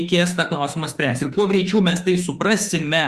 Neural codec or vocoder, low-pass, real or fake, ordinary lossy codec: autoencoder, 48 kHz, 32 numbers a frame, DAC-VAE, trained on Japanese speech; 14.4 kHz; fake; Opus, 64 kbps